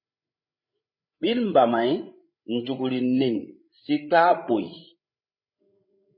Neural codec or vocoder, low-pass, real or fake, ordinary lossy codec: codec, 16 kHz, 16 kbps, FreqCodec, larger model; 5.4 kHz; fake; MP3, 24 kbps